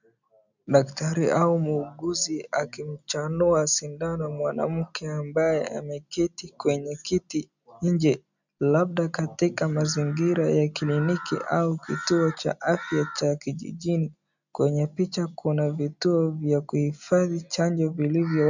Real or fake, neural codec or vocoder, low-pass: real; none; 7.2 kHz